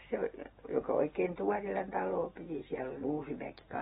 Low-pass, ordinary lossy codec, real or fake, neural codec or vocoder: 19.8 kHz; AAC, 16 kbps; real; none